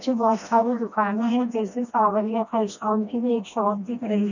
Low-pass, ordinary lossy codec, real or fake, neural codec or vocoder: 7.2 kHz; none; fake; codec, 16 kHz, 1 kbps, FreqCodec, smaller model